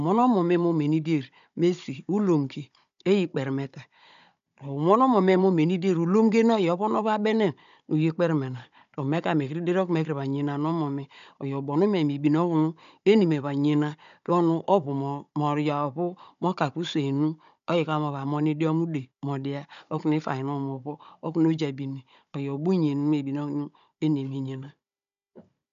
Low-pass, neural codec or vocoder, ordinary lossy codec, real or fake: 7.2 kHz; codec, 16 kHz, 16 kbps, FunCodec, trained on Chinese and English, 50 frames a second; AAC, 96 kbps; fake